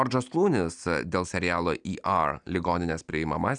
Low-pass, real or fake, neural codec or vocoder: 9.9 kHz; real; none